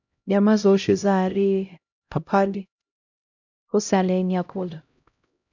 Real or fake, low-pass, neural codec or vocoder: fake; 7.2 kHz; codec, 16 kHz, 0.5 kbps, X-Codec, HuBERT features, trained on LibriSpeech